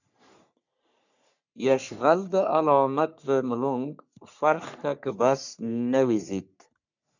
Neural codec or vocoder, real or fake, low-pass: codec, 44.1 kHz, 3.4 kbps, Pupu-Codec; fake; 7.2 kHz